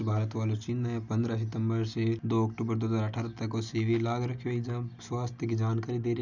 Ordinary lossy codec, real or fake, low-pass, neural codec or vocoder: none; real; 7.2 kHz; none